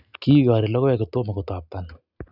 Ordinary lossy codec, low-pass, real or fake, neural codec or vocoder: none; 5.4 kHz; real; none